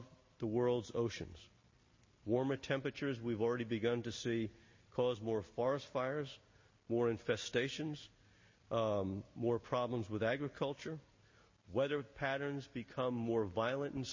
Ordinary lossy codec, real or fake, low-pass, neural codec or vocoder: MP3, 32 kbps; real; 7.2 kHz; none